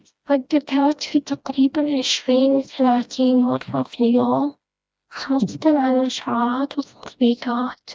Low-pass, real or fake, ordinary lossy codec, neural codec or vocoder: none; fake; none; codec, 16 kHz, 1 kbps, FreqCodec, smaller model